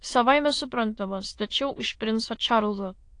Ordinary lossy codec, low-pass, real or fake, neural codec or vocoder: AAC, 48 kbps; 9.9 kHz; fake; autoencoder, 22.05 kHz, a latent of 192 numbers a frame, VITS, trained on many speakers